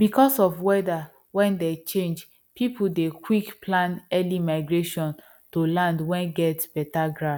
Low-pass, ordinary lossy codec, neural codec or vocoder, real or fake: 19.8 kHz; none; none; real